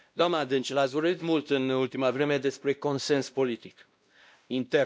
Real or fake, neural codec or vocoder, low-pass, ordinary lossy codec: fake; codec, 16 kHz, 1 kbps, X-Codec, WavLM features, trained on Multilingual LibriSpeech; none; none